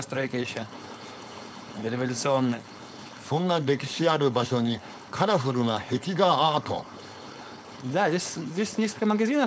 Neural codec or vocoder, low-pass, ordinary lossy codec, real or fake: codec, 16 kHz, 4.8 kbps, FACodec; none; none; fake